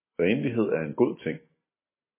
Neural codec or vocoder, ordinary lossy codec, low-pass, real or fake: none; MP3, 16 kbps; 3.6 kHz; real